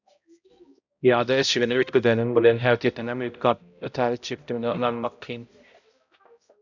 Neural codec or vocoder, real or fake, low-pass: codec, 16 kHz, 0.5 kbps, X-Codec, HuBERT features, trained on balanced general audio; fake; 7.2 kHz